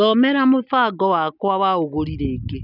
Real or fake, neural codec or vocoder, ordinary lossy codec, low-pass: real; none; none; 5.4 kHz